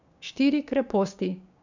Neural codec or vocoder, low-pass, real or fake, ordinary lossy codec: codec, 16 kHz, 6 kbps, DAC; 7.2 kHz; fake; none